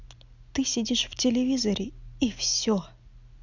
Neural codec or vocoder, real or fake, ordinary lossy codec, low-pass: none; real; none; 7.2 kHz